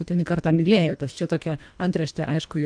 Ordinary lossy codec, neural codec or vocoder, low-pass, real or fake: MP3, 96 kbps; codec, 24 kHz, 1.5 kbps, HILCodec; 9.9 kHz; fake